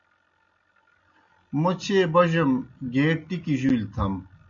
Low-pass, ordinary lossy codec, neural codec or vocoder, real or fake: 7.2 kHz; MP3, 96 kbps; none; real